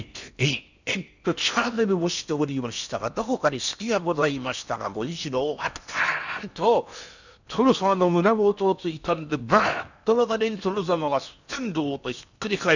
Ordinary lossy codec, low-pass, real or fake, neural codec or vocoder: none; 7.2 kHz; fake; codec, 16 kHz in and 24 kHz out, 0.8 kbps, FocalCodec, streaming, 65536 codes